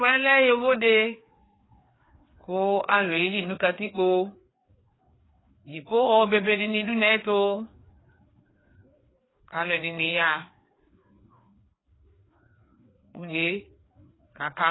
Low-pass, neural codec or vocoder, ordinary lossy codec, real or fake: 7.2 kHz; codec, 16 kHz, 2 kbps, FreqCodec, larger model; AAC, 16 kbps; fake